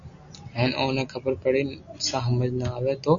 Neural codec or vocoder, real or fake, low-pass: none; real; 7.2 kHz